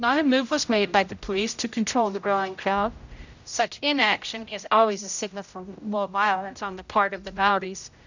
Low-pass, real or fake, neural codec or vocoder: 7.2 kHz; fake; codec, 16 kHz, 0.5 kbps, X-Codec, HuBERT features, trained on general audio